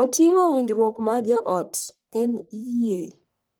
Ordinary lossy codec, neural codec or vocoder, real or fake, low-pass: none; codec, 44.1 kHz, 1.7 kbps, Pupu-Codec; fake; none